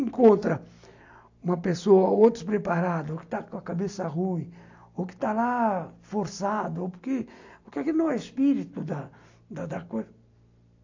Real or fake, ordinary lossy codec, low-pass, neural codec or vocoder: real; AAC, 48 kbps; 7.2 kHz; none